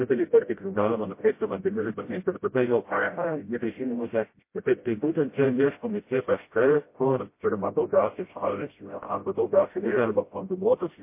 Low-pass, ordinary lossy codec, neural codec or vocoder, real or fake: 3.6 kHz; MP3, 24 kbps; codec, 16 kHz, 0.5 kbps, FreqCodec, smaller model; fake